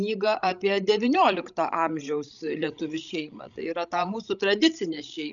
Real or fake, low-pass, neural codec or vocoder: fake; 7.2 kHz; codec, 16 kHz, 16 kbps, FreqCodec, larger model